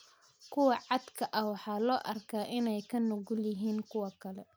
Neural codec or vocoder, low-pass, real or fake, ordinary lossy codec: none; none; real; none